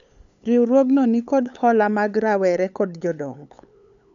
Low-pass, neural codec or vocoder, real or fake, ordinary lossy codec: 7.2 kHz; codec, 16 kHz, 8 kbps, FunCodec, trained on LibriTTS, 25 frames a second; fake; none